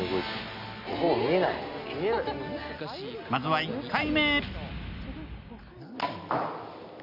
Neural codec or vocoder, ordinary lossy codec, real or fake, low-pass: none; none; real; 5.4 kHz